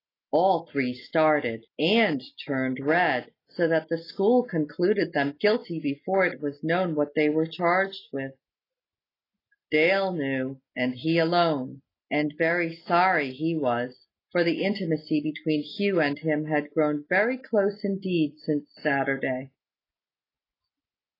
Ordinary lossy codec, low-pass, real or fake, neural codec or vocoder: AAC, 24 kbps; 5.4 kHz; real; none